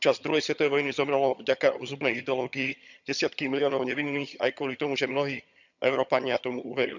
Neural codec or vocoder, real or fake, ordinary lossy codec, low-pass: vocoder, 22.05 kHz, 80 mel bands, HiFi-GAN; fake; none; 7.2 kHz